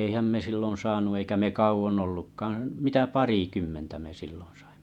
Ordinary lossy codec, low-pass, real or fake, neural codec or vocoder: none; 19.8 kHz; fake; vocoder, 48 kHz, 128 mel bands, Vocos